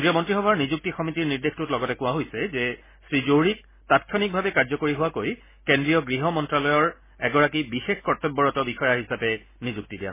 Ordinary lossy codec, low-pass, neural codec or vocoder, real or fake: MP3, 16 kbps; 3.6 kHz; none; real